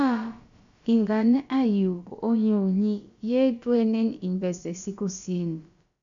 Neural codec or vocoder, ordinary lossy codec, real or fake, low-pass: codec, 16 kHz, about 1 kbps, DyCAST, with the encoder's durations; MP3, 64 kbps; fake; 7.2 kHz